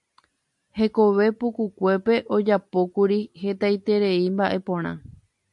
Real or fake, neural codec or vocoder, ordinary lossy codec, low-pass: real; none; MP3, 64 kbps; 10.8 kHz